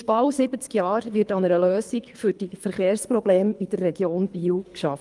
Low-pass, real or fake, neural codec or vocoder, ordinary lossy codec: 10.8 kHz; fake; codec, 24 kHz, 3 kbps, HILCodec; Opus, 32 kbps